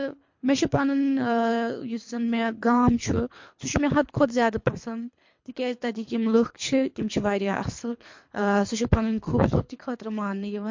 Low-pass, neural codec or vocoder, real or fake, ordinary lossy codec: 7.2 kHz; codec, 24 kHz, 3 kbps, HILCodec; fake; MP3, 48 kbps